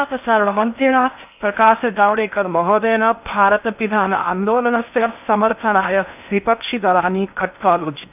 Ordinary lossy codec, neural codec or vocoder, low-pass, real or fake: none; codec, 16 kHz in and 24 kHz out, 0.6 kbps, FocalCodec, streaming, 4096 codes; 3.6 kHz; fake